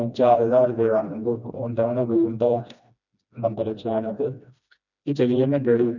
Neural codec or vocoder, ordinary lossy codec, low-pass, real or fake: codec, 16 kHz, 1 kbps, FreqCodec, smaller model; none; 7.2 kHz; fake